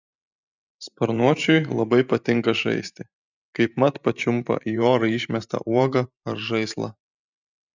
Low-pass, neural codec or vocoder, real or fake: 7.2 kHz; vocoder, 44.1 kHz, 128 mel bands every 512 samples, BigVGAN v2; fake